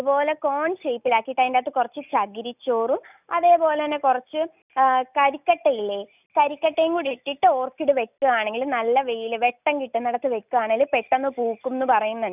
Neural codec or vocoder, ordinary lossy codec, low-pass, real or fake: none; none; 3.6 kHz; real